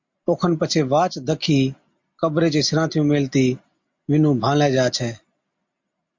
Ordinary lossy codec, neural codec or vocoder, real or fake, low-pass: MP3, 48 kbps; none; real; 7.2 kHz